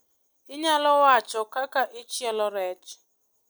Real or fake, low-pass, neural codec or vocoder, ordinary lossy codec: real; none; none; none